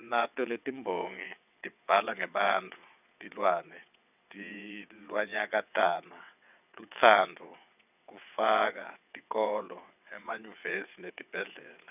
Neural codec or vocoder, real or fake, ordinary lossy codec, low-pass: vocoder, 22.05 kHz, 80 mel bands, WaveNeXt; fake; none; 3.6 kHz